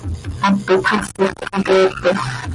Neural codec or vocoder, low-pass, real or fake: none; 10.8 kHz; real